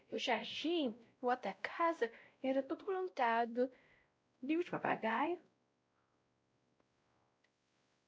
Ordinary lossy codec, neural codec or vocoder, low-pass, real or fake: none; codec, 16 kHz, 0.5 kbps, X-Codec, WavLM features, trained on Multilingual LibriSpeech; none; fake